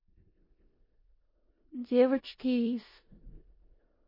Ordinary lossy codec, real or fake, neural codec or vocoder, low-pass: MP3, 24 kbps; fake; codec, 16 kHz in and 24 kHz out, 0.4 kbps, LongCat-Audio-Codec, four codebook decoder; 5.4 kHz